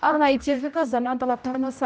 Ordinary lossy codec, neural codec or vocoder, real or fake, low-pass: none; codec, 16 kHz, 0.5 kbps, X-Codec, HuBERT features, trained on general audio; fake; none